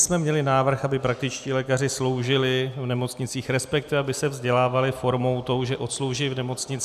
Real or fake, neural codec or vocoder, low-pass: real; none; 14.4 kHz